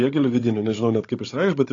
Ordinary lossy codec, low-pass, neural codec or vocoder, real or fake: AAC, 32 kbps; 7.2 kHz; codec, 16 kHz, 16 kbps, FunCodec, trained on Chinese and English, 50 frames a second; fake